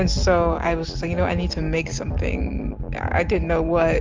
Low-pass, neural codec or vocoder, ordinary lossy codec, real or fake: 7.2 kHz; none; Opus, 32 kbps; real